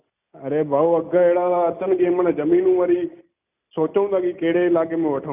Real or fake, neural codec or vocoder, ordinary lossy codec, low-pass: real; none; none; 3.6 kHz